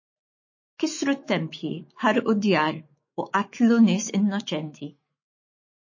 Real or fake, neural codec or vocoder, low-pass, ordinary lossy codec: fake; autoencoder, 48 kHz, 128 numbers a frame, DAC-VAE, trained on Japanese speech; 7.2 kHz; MP3, 32 kbps